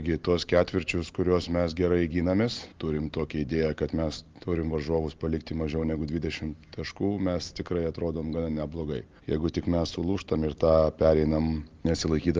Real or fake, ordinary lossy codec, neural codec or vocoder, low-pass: real; Opus, 32 kbps; none; 7.2 kHz